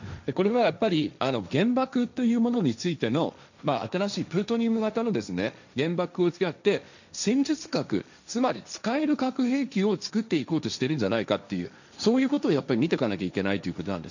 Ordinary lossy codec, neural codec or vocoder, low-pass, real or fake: none; codec, 16 kHz, 1.1 kbps, Voila-Tokenizer; 7.2 kHz; fake